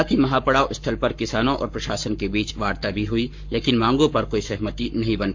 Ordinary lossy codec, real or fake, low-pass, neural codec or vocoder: MP3, 48 kbps; fake; 7.2 kHz; codec, 44.1 kHz, 7.8 kbps, Pupu-Codec